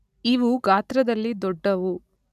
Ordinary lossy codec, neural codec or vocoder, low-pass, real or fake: none; none; 14.4 kHz; real